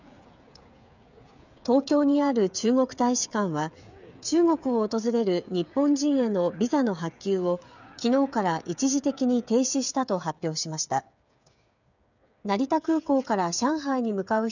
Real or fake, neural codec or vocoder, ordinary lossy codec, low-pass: fake; codec, 16 kHz, 8 kbps, FreqCodec, smaller model; none; 7.2 kHz